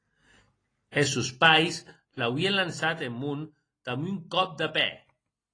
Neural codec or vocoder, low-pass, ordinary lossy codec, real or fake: none; 9.9 kHz; AAC, 32 kbps; real